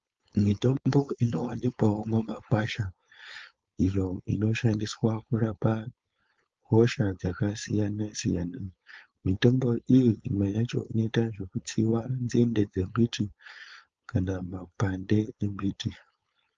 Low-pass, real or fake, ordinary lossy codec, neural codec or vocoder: 7.2 kHz; fake; Opus, 24 kbps; codec, 16 kHz, 4.8 kbps, FACodec